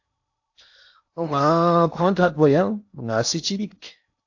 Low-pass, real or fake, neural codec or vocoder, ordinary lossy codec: 7.2 kHz; fake; codec, 16 kHz in and 24 kHz out, 0.8 kbps, FocalCodec, streaming, 65536 codes; AAC, 48 kbps